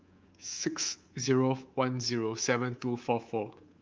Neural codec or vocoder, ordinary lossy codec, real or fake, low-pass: codec, 24 kHz, 3.1 kbps, DualCodec; Opus, 32 kbps; fake; 7.2 kHz